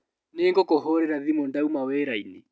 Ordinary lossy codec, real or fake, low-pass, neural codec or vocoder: none; real; none; none